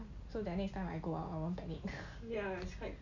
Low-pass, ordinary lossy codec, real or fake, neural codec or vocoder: 7.2 kHz; none; real; none